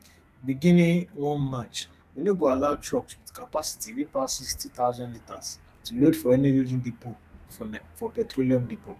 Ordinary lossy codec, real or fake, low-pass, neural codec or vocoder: none; fake; 14.4 kHz; codec, 32 kHz, 1.9 kbps, SNAC